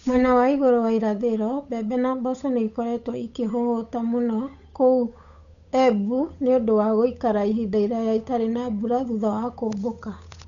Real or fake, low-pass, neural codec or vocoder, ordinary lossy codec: fake; 7.2 kHz; codec, 16 kHz, 8 kbps, FunCodec, trained on Chinese and English, 25 frames a second; none